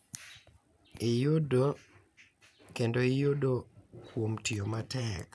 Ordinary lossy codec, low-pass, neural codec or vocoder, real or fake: none; none; none; real